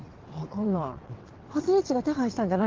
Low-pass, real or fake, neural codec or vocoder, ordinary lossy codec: 7.2 kHz; fake; vocoder, 22.05 kHz, 80 mel bands, WaveNeXt; Opus, 16 kbps